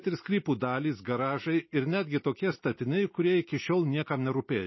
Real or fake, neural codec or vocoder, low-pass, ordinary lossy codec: real; none; 7.2 kHz; MP3, 24 kbps